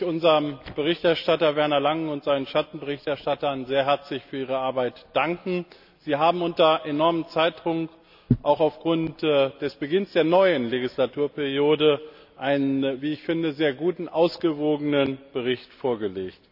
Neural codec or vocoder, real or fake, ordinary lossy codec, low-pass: none; real; none; 5.4 kHz